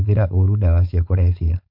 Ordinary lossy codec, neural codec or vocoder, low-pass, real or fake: MP3, 48 kbps; codec, 16 kHz, 4.8 kbps, FACodec; 5.4 kHz; fake